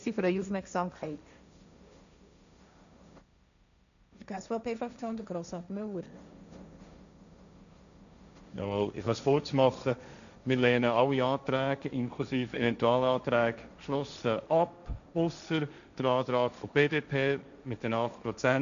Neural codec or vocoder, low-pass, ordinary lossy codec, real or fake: codec, 16 kHz, 1.1 kbps, Voila-Tokenizer; 7.2 kHz; none; fake